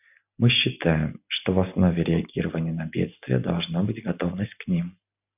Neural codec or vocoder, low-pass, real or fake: none; 3.6 kHz; real